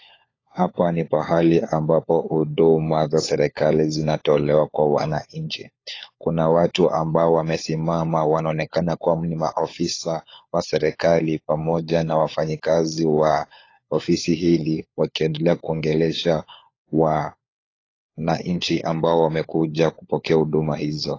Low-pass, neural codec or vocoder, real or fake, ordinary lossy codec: 7.2 kHz; codec, 16 kHz, 4 kbps, FunCodec, trained on LibriTTS, 50 frames a second; fake; AAC, 32 kbps